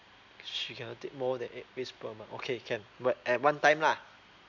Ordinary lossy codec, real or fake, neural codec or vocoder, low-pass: none; real; none; 7.2 kHz